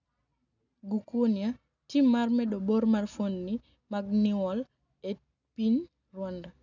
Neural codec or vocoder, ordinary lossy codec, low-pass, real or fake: none; none; 7.2 kHz; real